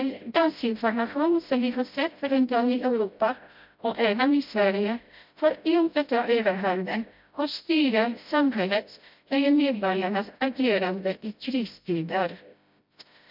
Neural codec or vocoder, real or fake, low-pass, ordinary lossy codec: codec, 16 kHz, 0.5 kbps, FreqCodec, smaller model; fake; 5.4 kHz; MP3, 48 kbps